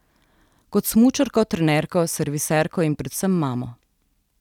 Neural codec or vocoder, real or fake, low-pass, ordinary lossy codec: none; real; 19.8 kHz; none